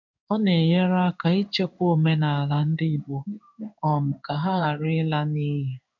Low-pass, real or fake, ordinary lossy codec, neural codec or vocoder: 7.2 kHz; fake; none; codec, 16 kHz in and 24 kHz out, 1 kbps, XY-Tokenizer